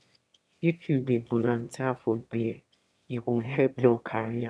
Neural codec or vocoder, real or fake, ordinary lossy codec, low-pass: autoencoder, 22.05 kHz, a latent of 192 numbers a frame, VITS, trained on one speaker; fake; none; none